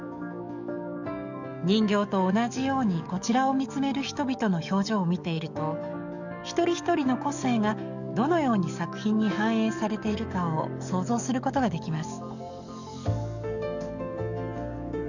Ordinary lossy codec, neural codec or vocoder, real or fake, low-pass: none; codec, 44.1 kHz, 7.8 kbps, DAC; fake; 7.2 kHz